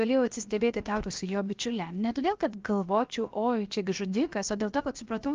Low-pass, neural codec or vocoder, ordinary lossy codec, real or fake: 7.2 kHz; codec, 16 kHz, 0.7 kbps, FocalCodec; Opus, 32 kbps; fake